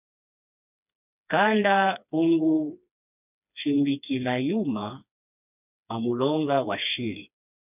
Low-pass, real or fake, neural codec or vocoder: 3.6 kHz; fake; codec, 16 kHz, 2 kbps, FreqCodec, smaller model